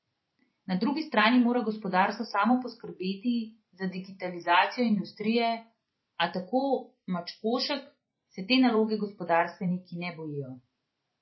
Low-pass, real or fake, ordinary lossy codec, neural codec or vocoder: 7.2 kHz; fake; MP3, 24 kbps; vocoder, 44.1 kHz, 128 mel bands every 512 samples, BigVGAN v2